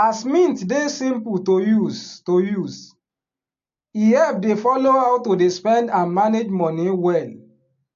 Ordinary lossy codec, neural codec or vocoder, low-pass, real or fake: AAC, 64 kbps; none; 7.2 kHz; real